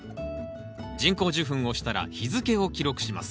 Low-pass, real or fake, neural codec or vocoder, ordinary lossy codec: none; real; none; none